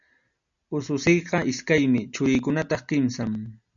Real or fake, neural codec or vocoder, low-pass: real; none; 7.2 kHz